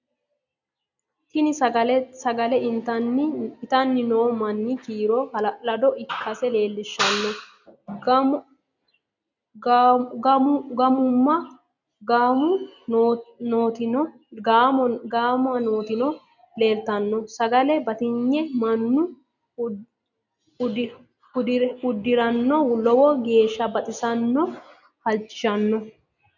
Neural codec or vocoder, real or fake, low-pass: none; real; 7.2 kHz